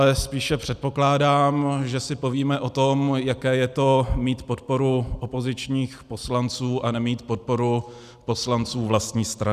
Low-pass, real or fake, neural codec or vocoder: 14.4 kHz; real; none